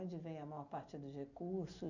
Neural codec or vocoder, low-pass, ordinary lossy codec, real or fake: none; 7.2 kHz; none; real